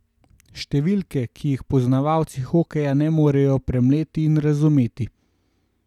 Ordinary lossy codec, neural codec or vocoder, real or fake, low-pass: none; none; real; 19.8 kHz